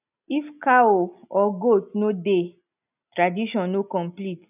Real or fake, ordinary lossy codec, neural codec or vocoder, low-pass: real; none; none; 3.6 kHz